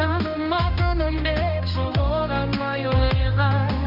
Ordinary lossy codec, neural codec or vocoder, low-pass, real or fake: none; codec, 16 kHz, 1 kbps, X-Codec, HuBERT features, trained on general audio; 5.4 kHz; fake